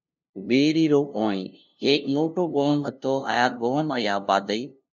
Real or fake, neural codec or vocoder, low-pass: fake; codec, 16 kHz, 0.5 kbps, FunCodec, trained on LibriTTS, 25 frames a second; 7.2 kHz